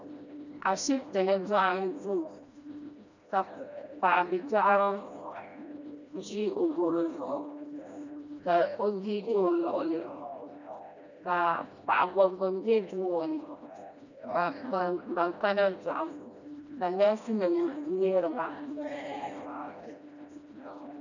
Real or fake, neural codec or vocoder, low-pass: fake; codec, 16 kHz, 1 kbps, FreqCodec, smaller model; 7.2 kHz